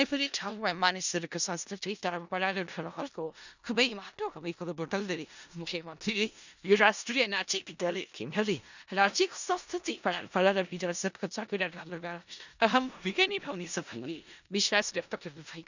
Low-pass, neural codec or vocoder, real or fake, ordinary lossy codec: 7.2 kHz; codec, 16 kHz in and 24 kHz out, 0.4 kbps, LongCat-Audio-Codec, four codebook decoder; fake; none